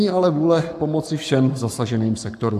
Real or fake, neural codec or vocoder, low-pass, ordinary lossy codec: fake; codec, 44.1 kHz, 7.8 kbps, Pupu-Codec; 14.4 kHz; AAC, 96 kbps